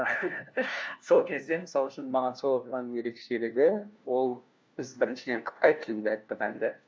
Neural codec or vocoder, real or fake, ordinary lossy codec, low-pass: codec, 16 kHz, 1 kbps, FunCodec, trained on LibriTTS, 50 frames a second; fake; none; none